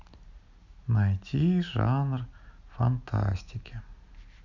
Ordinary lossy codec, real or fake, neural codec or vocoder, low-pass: none; real; none; 7.2 kHz